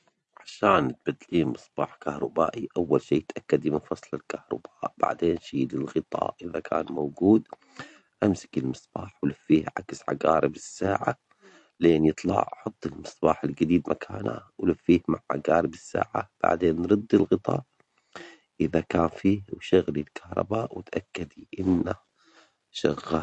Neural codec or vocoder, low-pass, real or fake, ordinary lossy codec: none; 10.8 kHz; real; MP3, 48 kbps